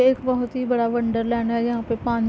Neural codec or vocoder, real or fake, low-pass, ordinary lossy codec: none; real; none; none